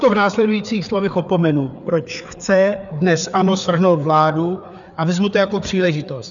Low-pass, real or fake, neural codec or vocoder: 7.2 kHz; fake; codec, 16 kHz, 4 kbps, FreqCodec, larger model